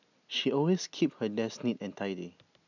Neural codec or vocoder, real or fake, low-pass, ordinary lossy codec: none; real; 7.2 kHz; none